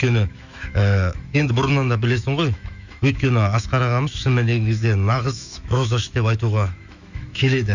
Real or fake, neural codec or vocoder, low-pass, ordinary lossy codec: fake; autoencoder, 48 kHz, 128 numbers a frame, DAC-VAE, trained on Japanese speech; 7.2 kHz; none